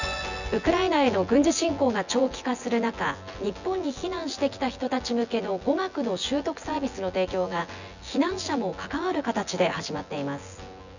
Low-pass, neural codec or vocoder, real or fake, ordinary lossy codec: 7.2 kHz; vocoder, 24 kHz, 100 mel bands, Vocos; fake; none